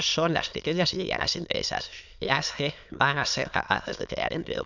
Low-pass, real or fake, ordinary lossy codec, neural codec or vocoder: 7.2 kHz; fake; none; autoencoder, 22.05 kHz, a latent of 192 numbers a frame, VITS, trained on many speakers